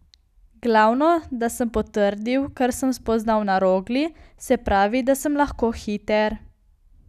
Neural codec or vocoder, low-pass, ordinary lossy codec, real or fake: none; 14.4 kHz; none; real